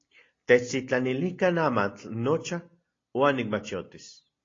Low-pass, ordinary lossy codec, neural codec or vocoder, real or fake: 7.2 kHz; AAC, 32 kbps; none; real